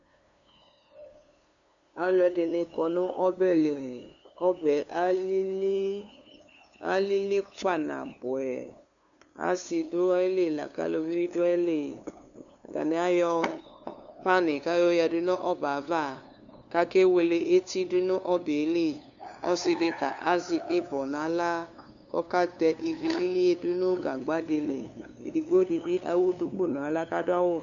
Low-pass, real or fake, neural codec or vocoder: 7.2 kHz; fake; codec, 16 kHz, 2 kbps, FunCodec, trained on LibriTTS, 25 frames a second